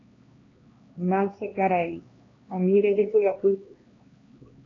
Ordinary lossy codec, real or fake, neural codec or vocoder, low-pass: AAC, 32 kbps; fake; codec, 16 kHz, 2 kbps, X-Codec, HuBERT features, trained on LibriSpeech; 7.2 kHz